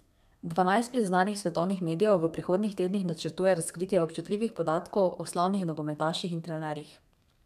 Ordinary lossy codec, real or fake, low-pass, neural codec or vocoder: none; fake; 14.4 kHz; codec, 32 kHz, 1.9 kbps, SNAC